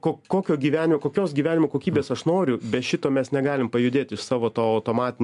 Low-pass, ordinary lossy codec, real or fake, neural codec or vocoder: 10.8 kHz; MP3, 96 kbps; real; none